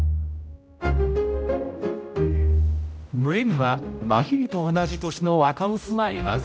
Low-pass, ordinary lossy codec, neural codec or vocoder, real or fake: none; none; codec, 16 kHz, 0.5 kbps, X-Codec, HuBERT features, trained on general audio; fake